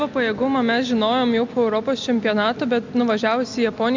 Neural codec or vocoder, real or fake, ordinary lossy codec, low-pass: none; real; MP3, 64 kbps; 7.2 kHz